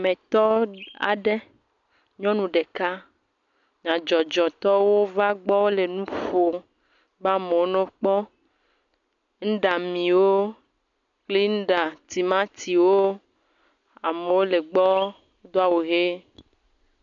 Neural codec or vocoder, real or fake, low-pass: none; real; 7.2 kHz